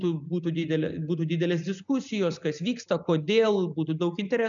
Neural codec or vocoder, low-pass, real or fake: none; 7.2 kHz; real